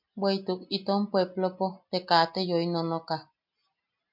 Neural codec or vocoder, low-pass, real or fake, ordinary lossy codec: none; 5.4 kHz; real; MP3, 48 kbps